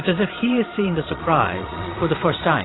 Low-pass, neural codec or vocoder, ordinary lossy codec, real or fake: 7.2 kHz; vocoder, 22.05 kHz, 80 mel bands, Vocos; AAC, 16 kbps; fake